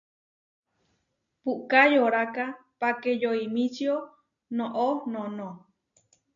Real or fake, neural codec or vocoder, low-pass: real; none; 7.2 kHz